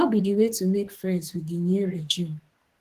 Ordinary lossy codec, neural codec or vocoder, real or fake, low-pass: Opus, 16 kbps; codec, 32 kHz, 1.9 kbps, SNAC; fake; 14.4 kHz